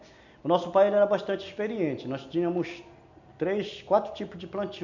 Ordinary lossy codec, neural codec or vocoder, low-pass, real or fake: none; none; 7.2 kHz; real